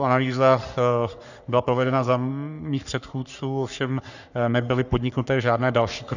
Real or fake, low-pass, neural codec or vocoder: fake; 7.2 kHz; codec, 44.1 kHz, 3.4 kbps, Pupu-Codec